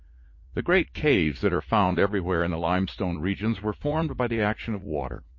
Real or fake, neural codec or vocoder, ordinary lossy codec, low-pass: fake; vocoder, 44.1 kHz, 80 mel bands, Vocos; MP3, 32 kbps; 7.2 kHz